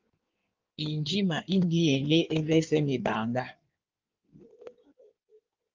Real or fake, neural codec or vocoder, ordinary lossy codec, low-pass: fake; codec, 16 kHz in and 24 kHz out, 1.1 kbps, FireRedTTS-2 codec; Opus, 24 kbps; 7.2 kHz